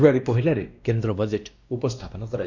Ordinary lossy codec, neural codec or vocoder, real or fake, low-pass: Opus, 64 kbps; codec, 16 kHz, 1 kbps, X-Codec, WavLM features, trained on Multilingual LibriSpeech; fake; 7.2 kHz